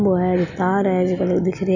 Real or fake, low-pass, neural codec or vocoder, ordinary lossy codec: real; 7.2 kHz; none; none